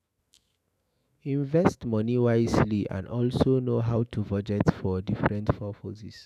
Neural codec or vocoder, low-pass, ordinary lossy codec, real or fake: autoencoder, 48 kHz, 128 numbers a frame, DAC-VAE, trained on Japanese speech; 14.4 kHz; none; fake